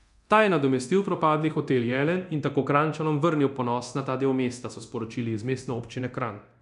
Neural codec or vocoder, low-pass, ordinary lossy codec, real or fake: codec, 24 kHz, 0.9 kbps, DualCodec; 10.8 kHz; none; fake